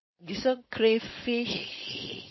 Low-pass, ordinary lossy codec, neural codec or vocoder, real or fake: 7.2 kHz; MP3, 24 kbps; codec, 16 kHz, 4.8 kbps, FACodec; fake